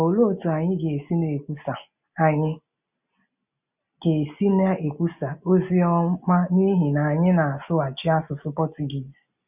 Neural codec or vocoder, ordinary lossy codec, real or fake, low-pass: none; none; real; 3.6 kHz